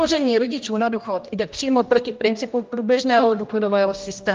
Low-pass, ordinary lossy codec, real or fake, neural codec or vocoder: 7.2 kHz; Opus, 24 kbps; fake; codec, 16 kHz, 1 kbps, X-Codec, HuBERT features, trained on general audio